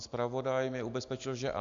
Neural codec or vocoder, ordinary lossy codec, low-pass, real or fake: none; AAC, 64 kbps; 7.2 kHz; real